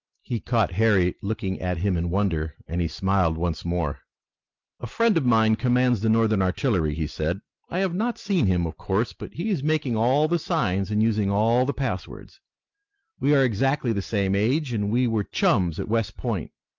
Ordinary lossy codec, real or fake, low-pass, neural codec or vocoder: Opus, 32 kbps; real; 7.2 kHz; none